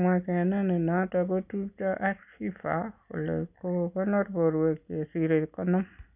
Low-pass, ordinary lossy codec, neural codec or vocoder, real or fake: 3.6 kHz; none; none; real